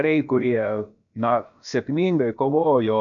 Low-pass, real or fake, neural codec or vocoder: 7.2 kHz; fake; codec, 16 kHz, about 1 kbps, DyCAST, with the encoder's durations